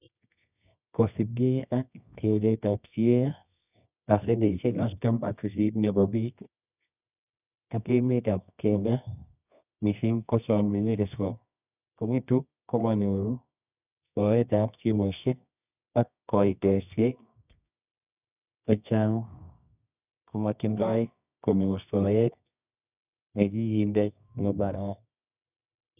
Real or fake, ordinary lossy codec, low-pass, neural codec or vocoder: fake; none; 3.6 kHz; codec, 24 kHz, 0.9 kbps, WavTokenizer, medium music audio release